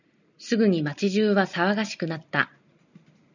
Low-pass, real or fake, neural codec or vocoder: 7.2 kHz; real; none